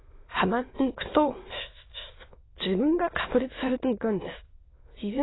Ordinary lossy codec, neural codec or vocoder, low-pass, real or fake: AAC, 16 kbps; autoencoder, 22.05 kHz, a latent of 192 numbers a frame, VITS, trained on many speakers; 7.2 kHz; fake